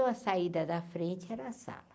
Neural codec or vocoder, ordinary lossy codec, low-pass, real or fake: none; none; none; real